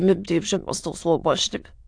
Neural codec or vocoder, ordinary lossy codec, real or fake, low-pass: autoencoder, 22.05 kHz, a latent of 192 numbers a frame, VITS, trained on many speakers; AAC, 64 kbps; fake; 9.9 kHz